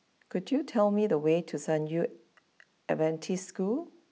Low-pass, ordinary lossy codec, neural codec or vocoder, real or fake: none; none; none; real